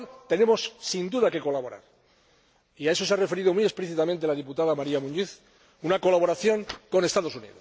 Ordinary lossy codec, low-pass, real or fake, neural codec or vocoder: none; none; real; none